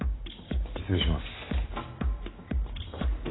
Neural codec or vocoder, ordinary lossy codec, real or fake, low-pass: none; AAC, 16 kbps; real; 7.2 kHz